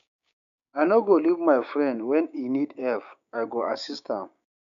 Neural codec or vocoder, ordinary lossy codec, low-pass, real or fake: codec, 16 kHz, 6 kbps, DAC; none; 7.2 kHz; fake